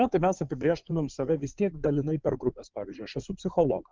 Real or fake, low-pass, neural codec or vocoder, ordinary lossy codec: fake; 7.2 kHz; codec, 16 kHz in and 24 kHz out, 2.2 kbps, FireRedTTS-2 codec; Opus, 32 kbps